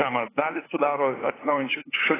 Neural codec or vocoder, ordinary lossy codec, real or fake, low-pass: codec, 16 kHz, 8 kbps, FunCodec, trained on LibriTTS, 25 frames a second; AAC, 16 kbps; fake; 3.6 kHz